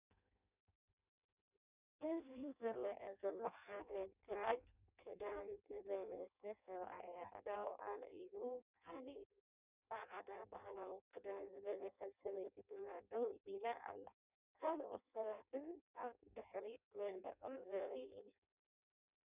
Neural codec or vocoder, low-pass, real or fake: codec, 16 kHz in and 24 kHz out, 0.6 kbps, FireRedTTS-2 codec; 3.6 kHz; fake